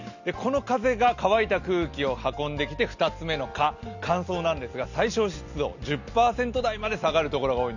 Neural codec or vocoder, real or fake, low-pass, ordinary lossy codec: none; real; 7.2 kHz; none